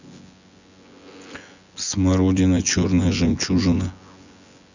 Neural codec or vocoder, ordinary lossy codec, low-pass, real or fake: vocoder, 24 kHz, 100 mel bands, Vocos; none; 7.2 kHz; fake